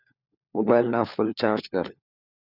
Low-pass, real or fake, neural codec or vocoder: 5.4 kHz; fake; codec, 16 kHz, 4 kbps, FunCodec, trained on LibriTTS, 50 frames a second